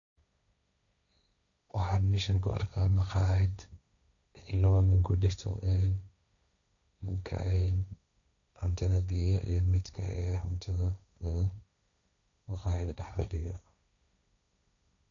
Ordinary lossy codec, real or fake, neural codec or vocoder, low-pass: MP3, 96 kbps; fake; codec, 16 kHz, 1.1 kbps, Voila-Tokenizer; 7.2 kHz